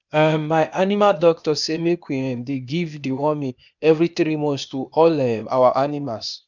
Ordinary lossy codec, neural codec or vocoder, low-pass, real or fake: none; codec, 16 kHz, 0.8 kbps, ZipCodec; 7.2 kHz; fake